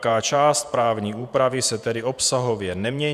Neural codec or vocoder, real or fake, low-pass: vocoder, 48 kHz, 128 mel bands, Vocos; fake; 14.4 kHz